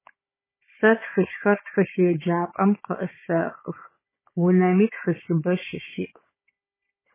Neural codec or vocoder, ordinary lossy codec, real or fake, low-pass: codec, 16 kHz, 16 kbps, FunCodec, trained on Chinese and English, 50 frames a second; MP3, 16 kbps; fake; 3.6 kHz